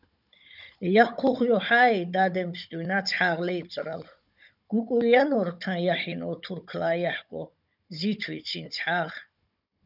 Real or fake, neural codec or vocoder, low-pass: fake; codec, 16 kHz, 16 kbps, FunCodec, trained on Chinese and English, 50 frames a second; 5.4 kHz